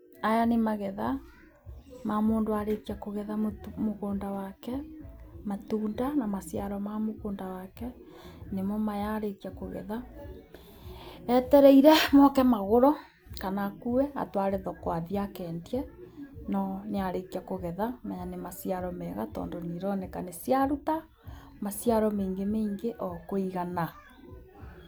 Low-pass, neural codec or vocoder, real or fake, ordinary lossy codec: none; none; real; none